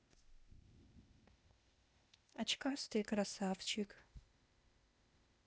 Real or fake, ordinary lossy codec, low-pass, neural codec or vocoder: fake; none; none; codec, 16 kHz, 0.8 kbps, ZipCodec